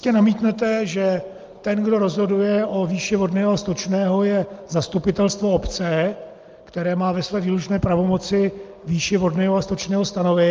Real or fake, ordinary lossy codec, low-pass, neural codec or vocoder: real; Opus, 24 kbps; 7.2 kHz; none